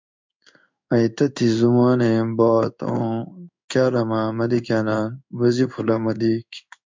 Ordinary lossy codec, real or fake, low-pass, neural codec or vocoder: MP3, 64 kbps; fake; 7.2 kHz; codec, 16 kHz in and 24 kHz out, 1 kbps, XY-Tokenizer